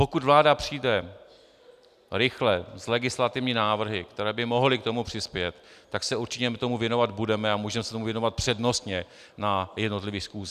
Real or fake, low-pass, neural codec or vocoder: real; 14.4 kHz; none